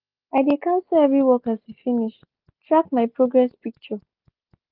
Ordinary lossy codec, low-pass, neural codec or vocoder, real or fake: Opus, 24 kbps; 5.4 kHz; none; real